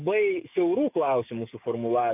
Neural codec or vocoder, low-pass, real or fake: codec, 44.1 kHz, 7.8 kbps, DAC; 3.6 kHz; fake